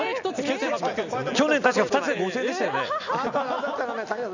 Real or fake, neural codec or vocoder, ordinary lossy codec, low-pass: real; none; none; 7.2 kHz